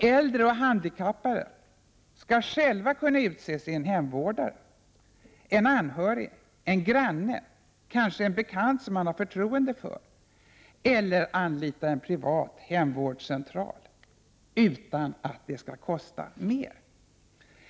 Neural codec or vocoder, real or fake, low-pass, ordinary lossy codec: none; real; none; none